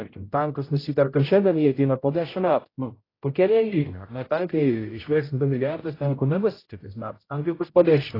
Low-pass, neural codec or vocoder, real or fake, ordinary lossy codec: 5.4 kHz; codec, 16 kHz, 0.5 kbps, X-Codec, HuBERT features, trained on general audio; fake; AAC, 24 kbps